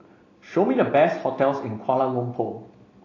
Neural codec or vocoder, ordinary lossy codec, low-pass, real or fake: none; AAC, 32 kbps; 7.2 kHz; real